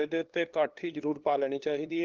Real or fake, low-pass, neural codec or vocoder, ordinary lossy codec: fake; 7.2 kHz; codec, 16 kHz, 2 kbps, FunCodec, trained on LibriTTS, 25 frames a second; Opus, 16 kbps